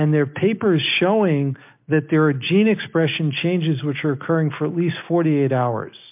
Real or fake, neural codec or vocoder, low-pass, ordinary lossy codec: real; none; 3.6 kHz; MP3, 32 kbps